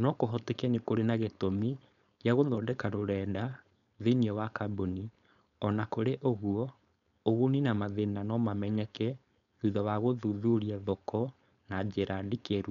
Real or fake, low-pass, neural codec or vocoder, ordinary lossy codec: fake; 7.2 kHz; codec, 16 kHz, 4.8 kbps, FACodec; none